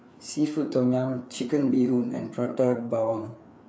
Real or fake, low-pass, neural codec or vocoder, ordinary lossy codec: fake; none; codec, 16 kHz, 4 kbps, FreqCodec, larger model; none